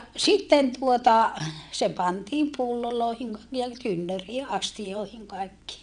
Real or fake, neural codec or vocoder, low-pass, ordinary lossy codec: fake; vocoder, 22.05 kHz, 80 mel bands, WaveNeXt; 9.9 kHz; none